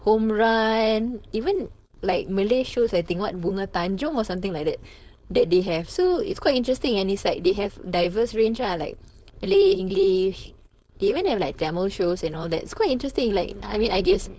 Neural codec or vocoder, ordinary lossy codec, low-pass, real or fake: codec, 16 kHz, 4.8 kbps, FACodec; none; none; fake